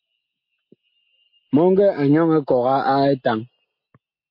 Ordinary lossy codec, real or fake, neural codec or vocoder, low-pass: MP3, 32 kbps; real; none; 5.4 kHz